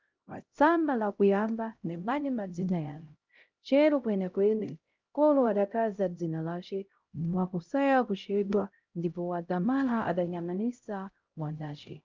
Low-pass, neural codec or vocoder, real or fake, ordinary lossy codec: 7.2 kHz; codec, 16 kHz, 0.5 kbps, X-Codec, HuBERT features, trained on LibriSpeech; fake; Opus, 24 kbps